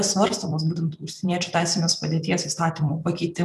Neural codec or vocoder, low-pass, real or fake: vocoder, 44.1 kHz, 128 mel bands every 256 samples, BigVGAN v2; 14.4 kHz; fake